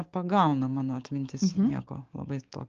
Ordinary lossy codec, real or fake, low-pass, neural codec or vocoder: Opus, 24 kbps; fake; 7.2 kHz; codec, 16 kHz, 6 kbps, DAC